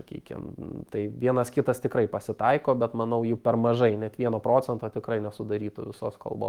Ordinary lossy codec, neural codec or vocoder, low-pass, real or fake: Opus, 24 kbps; autoencoder, 48 kHz, 128 numbers a frame, DAC-VAE, trained on Japanese speech; 19.8 kHz; fake